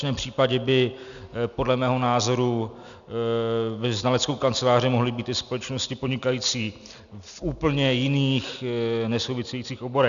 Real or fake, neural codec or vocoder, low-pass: real; none; 7.2 kHz